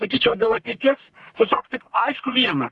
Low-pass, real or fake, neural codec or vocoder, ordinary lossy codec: 10.8 kHz; fake; codec, 44.1 kHz, 1.7 kbps, Pupu-Codec; Opus, 64 kbps